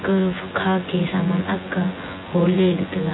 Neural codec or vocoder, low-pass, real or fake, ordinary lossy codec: vocoder, 24 kHz, 100 mel bands, Vocos; 7.2 kHz; fake; AAC, 16 kbps